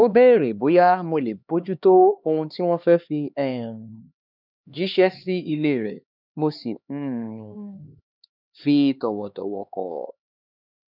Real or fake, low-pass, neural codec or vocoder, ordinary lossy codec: fake; 5.4 kHz; codec, 16 kHz, 2 kbps, X-Codec, HuBERT features, trained on LibriSpeech; none